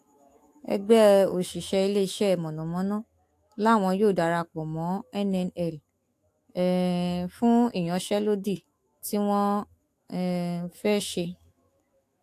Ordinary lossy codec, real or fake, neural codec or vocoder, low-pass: AAC, 64 kbps; fake; autoencoder, 48 kHz, 128 numbers a frame, DAC-VAE, trained on Japanese speech; 14.4 kHz